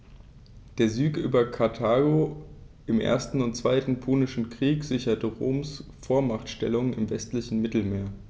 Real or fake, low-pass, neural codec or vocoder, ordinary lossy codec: real; none; none; none